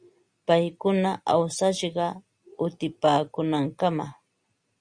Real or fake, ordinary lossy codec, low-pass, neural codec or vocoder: real; Opus, 64 kbps; 9.9 kHz; none